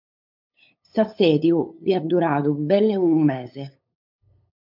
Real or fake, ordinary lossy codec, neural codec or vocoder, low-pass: fake; MP3, 48 kbps; codec, 16 kHz, 8 kbps, FunCodec, trained on LibriTTS, 25 frames a second; 5.4 kHz